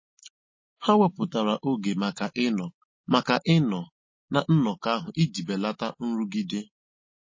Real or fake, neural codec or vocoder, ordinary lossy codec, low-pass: real; none; MP3, 32 kbps; 7.2 kHz